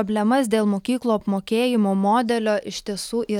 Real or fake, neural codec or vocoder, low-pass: real; none; 19.8 kHz